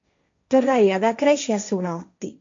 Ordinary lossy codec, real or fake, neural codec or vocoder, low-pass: MP3, 48 kbps; fake; codec, 16 kHz, 1.1 kbps, Voila-Tokenizer; 7.2 kHz